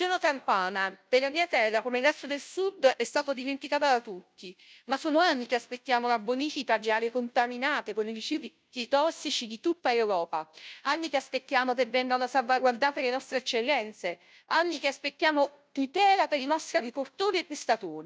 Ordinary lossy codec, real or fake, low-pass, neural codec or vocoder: none; fake; none; codec, 16 kHz, 0.5 kbps, FunCodec, trained on Chinese and English, 25 frames a second